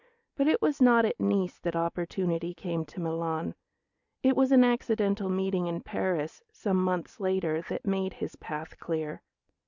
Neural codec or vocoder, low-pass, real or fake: none; 7.2 kHz; real